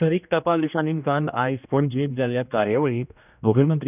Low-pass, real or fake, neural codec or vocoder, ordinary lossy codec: 3.6 kHz; fake; codec, 16 kHz, 1 kbps, X-Codec, HuBERT features, trained on general audio; none